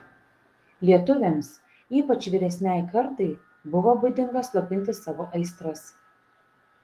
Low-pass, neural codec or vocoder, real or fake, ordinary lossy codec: 14.4 kHz; codec, 44.1 kHz, 7.8 kbps, DAC; fake; Opus, 24 kbps